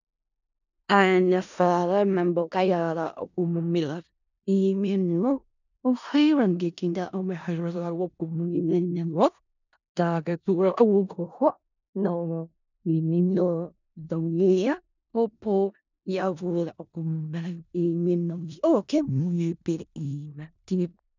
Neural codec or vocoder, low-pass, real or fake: codec, 16 kHz in and 24 kHz out, 0.4 kbps, LongCat-Audio-Codec, four codebook decoder; 7.2 kHz; fake